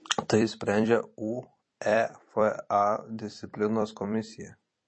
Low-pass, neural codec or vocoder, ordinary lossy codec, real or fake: 9.9 kHz; vocoder, 44.1 kHz, 128 mel bands every 256 samples, BigVGAN v2; MP3, 32 kbps; fake